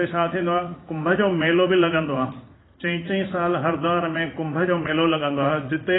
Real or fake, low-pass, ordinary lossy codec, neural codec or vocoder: fake; 7.2 kHz; AAC, 16 kbps; vocoder, 44.1 kHz, 80 mel bands, Vocos